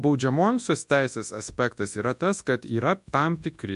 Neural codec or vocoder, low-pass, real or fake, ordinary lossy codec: codec, 24 kHz, 0.9 kbps, WavTokenizer, large speech release; 10.8 kHz; fake; MP3, 64 kbps